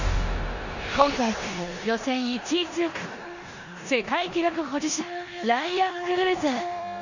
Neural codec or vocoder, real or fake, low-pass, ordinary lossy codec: codec, 16 kHz in and 24 kHz out, 0.9 kbps, LongCat-Audio-Codec, four codebook decoder; fake; 7.2 kHz; none